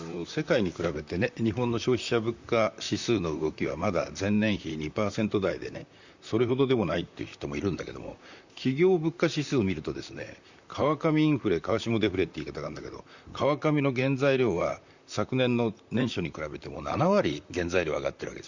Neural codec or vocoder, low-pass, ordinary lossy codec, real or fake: vocoder, 44.1 kHz, 128 mel bands, Pupu-Vocoder; 7.2 kHz; Opus, 64 kbps; fake